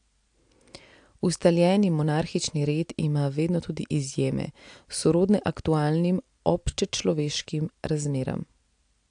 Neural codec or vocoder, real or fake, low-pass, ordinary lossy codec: none; real; 9.9 kHz; AAC, 64 kbps